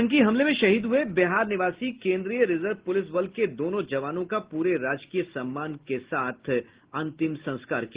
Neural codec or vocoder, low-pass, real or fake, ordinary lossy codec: none; 3.6 kHz; real; Opus, 16 kbps